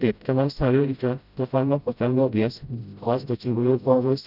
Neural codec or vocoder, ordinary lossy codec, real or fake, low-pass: codec, 16 kHz, 0.5 kbps, FreqCodec, smaller model; none; fake; 5.4 kHz